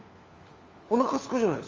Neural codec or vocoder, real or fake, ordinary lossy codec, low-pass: codec, 16 kHz, 4 kbps, FunCodec, trained on LibriTTS, 50 frames a second; fake; Opus, 32 kbps; 7.2 kHz